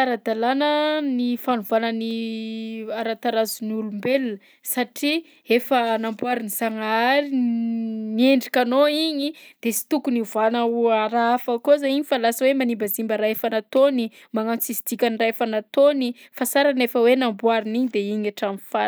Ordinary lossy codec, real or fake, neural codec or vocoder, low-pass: none; real; none; none